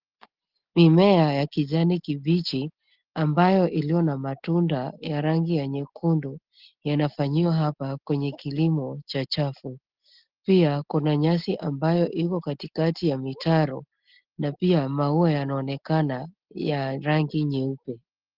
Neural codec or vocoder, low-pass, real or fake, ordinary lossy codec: none; 5.4 kHz; real; Opus, 16 kbps